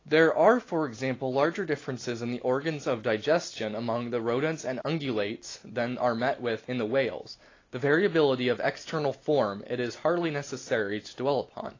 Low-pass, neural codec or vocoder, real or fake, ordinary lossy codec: 7.2 kHz; none; real; AAC, 32 kbps